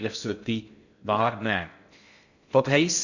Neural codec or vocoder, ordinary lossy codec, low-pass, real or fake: codec, 16 kHz in and 24 kHz out, 0.6 kbps, FocalCodec, streaming, 2048 codes; none; 7.2 kHz; fake